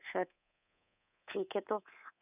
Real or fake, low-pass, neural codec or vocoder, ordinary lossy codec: fake; 3.6 kHz; codec, 24 kHz, 3.1 kbps, DualCodec; none